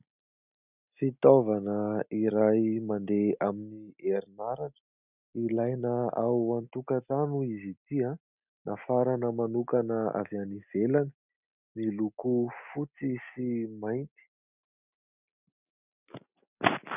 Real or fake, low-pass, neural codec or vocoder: real; 3.6 kHz; none